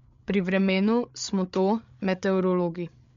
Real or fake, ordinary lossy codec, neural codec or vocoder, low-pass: fake; MP3, 64 kbps; codec, 16 kHz, 8 kbps, FreqCodec, larger model; 7.2 kHz